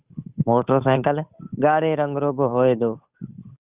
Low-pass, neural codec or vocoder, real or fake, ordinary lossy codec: 3.6 kHz; codec, 16 kHz, 8 kbps, FunCodec, trained on Chinese and English, 25 frames a second; fake; Opus, 64 kbps